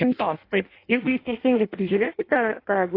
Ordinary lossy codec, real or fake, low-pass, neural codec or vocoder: AAC, 32 kbps; fake; 5.4 kHz; codec, 16 kHz in and 24 kHz out, 0.6 kbps, FireRedTTS-2 codec